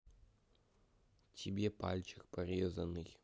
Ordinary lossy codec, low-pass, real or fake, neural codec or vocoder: none; none; real; none